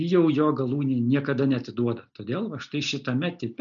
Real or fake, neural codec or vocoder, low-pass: real; none; 7.2 kHz